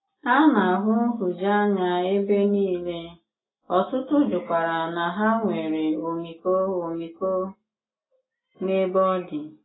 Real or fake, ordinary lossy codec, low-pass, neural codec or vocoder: real; AAC, 16 kbps; 7.2 kHz; none